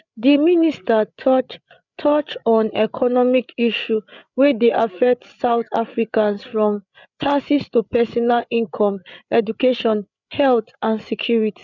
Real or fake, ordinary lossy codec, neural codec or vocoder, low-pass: fake; none; codec, 16 kHz, 8 kbps, FreqCodec, larger model; 7.2 kHz